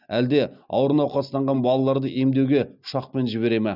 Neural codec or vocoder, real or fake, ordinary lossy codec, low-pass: none; real; none; 5.4 kHz